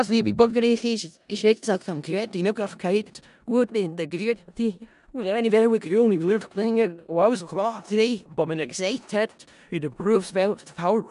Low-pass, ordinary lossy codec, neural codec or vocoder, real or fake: 10.8 kHz; none; codec, 16 kHz in and 24 kHz out, 0.4 kbps, LongCat-Audio-Codec, four codebook decoder; fake